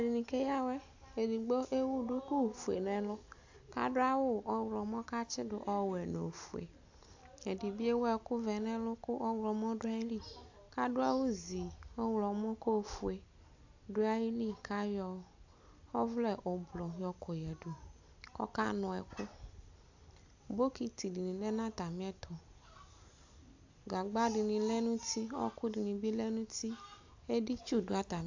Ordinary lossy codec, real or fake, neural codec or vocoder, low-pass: AAC, 48 kbps; real; none; 7.2 kHz